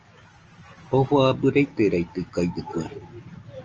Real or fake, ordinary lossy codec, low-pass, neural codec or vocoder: real; Opus, 24 kbps; 7.2 kHz; none